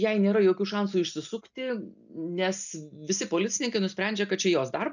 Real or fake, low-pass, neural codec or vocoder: real; 7.2 kHz; none